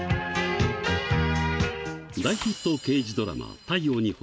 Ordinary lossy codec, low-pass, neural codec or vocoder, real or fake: none; none; none; real